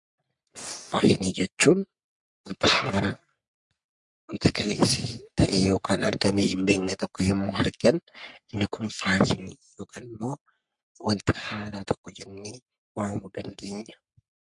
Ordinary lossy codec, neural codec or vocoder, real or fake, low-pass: MP3, 64 kbps; codec, 44.1 kHz, 3.4 kbps, Pupu-Codec; fake; 10.8 kHz